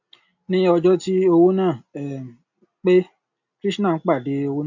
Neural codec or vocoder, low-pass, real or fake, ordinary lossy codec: none; 7.2 kHz; real; none